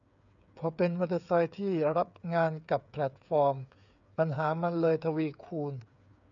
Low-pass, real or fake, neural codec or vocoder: 7.2 kHz; fake; codec, 16 kHz, 8 kbps, FreqCodec, smaller model